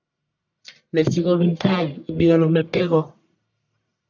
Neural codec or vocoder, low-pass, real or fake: codec, 44.1 kHz, 1.7 kbps, Pupu-Codec; 7.2 kHz; fake